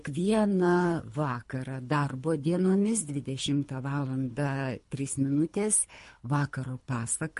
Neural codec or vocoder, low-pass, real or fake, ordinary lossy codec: codec, 24 kHz, 3 kbps, HILCodec; 10.8 kHz; fake; MP3, 48 kbps